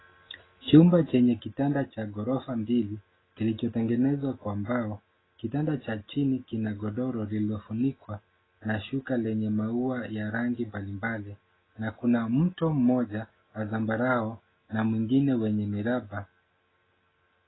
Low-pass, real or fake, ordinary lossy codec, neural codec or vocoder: 7.2 kHz; real; AAC, 16 kbps; none